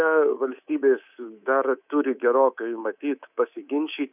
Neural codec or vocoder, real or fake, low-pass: codec, 24 kHz, 3.1 kbps, DualCodec; fake; 3.6 kHz